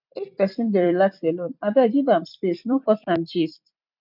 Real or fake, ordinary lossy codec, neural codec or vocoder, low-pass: fake; none; vocoder, 44.1 kHz, 128 mel bands every 256 samples, BigVGAN v2; 5.4 kHz